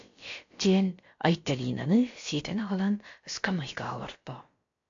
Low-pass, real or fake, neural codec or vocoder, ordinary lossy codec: 7.2 kHz; fake; codec, 16 kHz, about 1 kbps, DyCAST, with the encoder's durations; AAC, 48 kbps